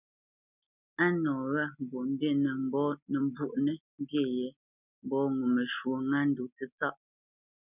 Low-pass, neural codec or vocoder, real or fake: 3.6 kHz; none; real